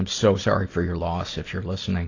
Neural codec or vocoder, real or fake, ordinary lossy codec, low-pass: none; real; AAC, 32 kbps; 7.2 kHz